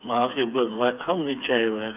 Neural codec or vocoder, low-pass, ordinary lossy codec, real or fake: codec, 16 kHz, 4 kbps, FreqCodec, smaller model; 3.6 kHz; none; fake